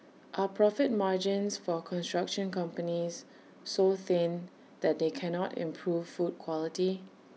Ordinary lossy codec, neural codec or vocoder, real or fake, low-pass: none; none; real; none